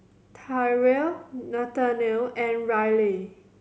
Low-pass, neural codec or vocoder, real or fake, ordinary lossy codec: none; none; real; none